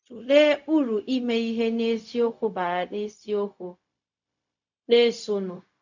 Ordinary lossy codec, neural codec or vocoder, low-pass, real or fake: none; codec, 16 kHz, 0.4 kbps, LongCat-Audio-Codec; 7.2 kHz; fake